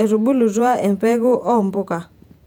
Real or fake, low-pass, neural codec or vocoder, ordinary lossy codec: fake; 19.8 kHz; vocoder, 48 kHz, 128 mel bands, Vocos; none